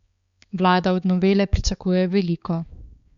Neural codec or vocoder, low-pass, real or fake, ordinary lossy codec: codec, 16 kHz, 4 kbps, X-Codec, HuBERT features, trained on balanced general audio; 7.2 kHz; fake; Opus, 64 kbps